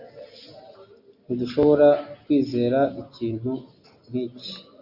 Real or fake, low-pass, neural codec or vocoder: real; 5.4 kHz; none